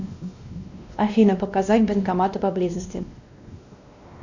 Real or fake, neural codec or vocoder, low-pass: fake; codec, 16 kHz, 1 kbps, X-Codec, WavLM features, trained on Multilingual LibriSpeech; 7.2 kHz